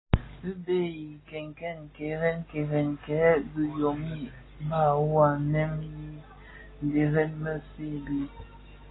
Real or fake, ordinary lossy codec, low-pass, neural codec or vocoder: real; AAC, 16 kbps; 7.2 kHz; none